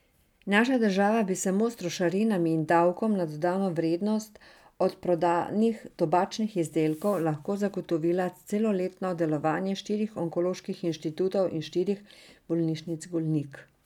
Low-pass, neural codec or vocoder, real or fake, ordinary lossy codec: 19.8 kHz; none; real; none